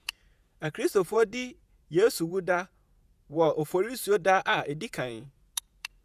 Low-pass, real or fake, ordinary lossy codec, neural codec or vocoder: 14.4 kHz; fake; none; vocoder, 48 kHz, 128 mel bands, Vocos